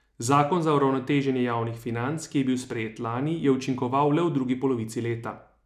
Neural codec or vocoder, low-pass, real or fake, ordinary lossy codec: none; 14.4 kHz; real; none